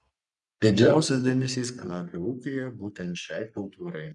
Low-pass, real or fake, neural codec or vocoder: 10.8 kHz; fake; codec, 44.1 kHz, 3.4 kbps, Pupu-Codec